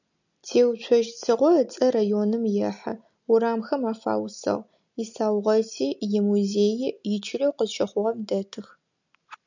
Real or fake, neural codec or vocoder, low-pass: real; none; 7.2 kHz